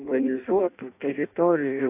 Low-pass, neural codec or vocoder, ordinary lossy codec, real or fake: 3.6 kHz; codec, 16 kHz in and 24 kHz out, 0.6 kbps, FireRedTTS-2 codec; AAC, 32 kbps; fake